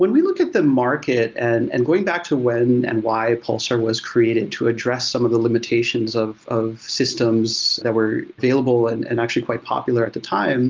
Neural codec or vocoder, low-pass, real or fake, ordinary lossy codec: none; 7.2 kHz; real; Opus, 32 kbps